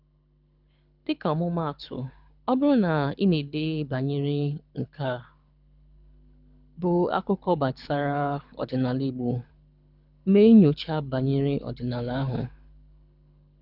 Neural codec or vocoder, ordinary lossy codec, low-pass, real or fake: codec, 24 kHz, 6 kbps, HILCodec; none; 5.4 kHz; fake